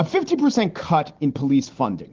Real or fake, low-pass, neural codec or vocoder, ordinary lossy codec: real; 7.2 kHz; none; Opus, 16 kbps